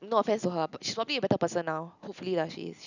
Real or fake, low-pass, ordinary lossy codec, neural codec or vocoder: real; 7.2 kHz; none; none